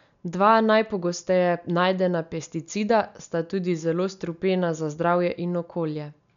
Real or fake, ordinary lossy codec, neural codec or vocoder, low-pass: real; none; none; 7.2 kHz